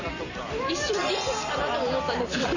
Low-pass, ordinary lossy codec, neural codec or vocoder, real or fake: 7.2 kHz; none; vocoder, 44.1 kHz, 128 mel bands every 256 samples, BigVGAN v2; fake